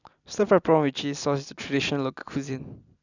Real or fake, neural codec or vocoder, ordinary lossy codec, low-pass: real; none; none; 7.2 kHz